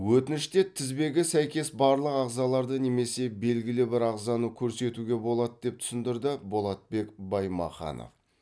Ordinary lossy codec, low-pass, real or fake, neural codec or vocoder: none; none; real; none